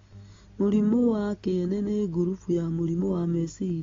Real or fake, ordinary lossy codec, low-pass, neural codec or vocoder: real; AAC, 24 kbps; 7.2 kHz; none